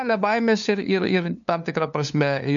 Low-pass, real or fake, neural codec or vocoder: 7.2 kHz; fake; codec, 16 kHz, 2 kbps, FunCodec, trained on LibriTTS, 25 frames a second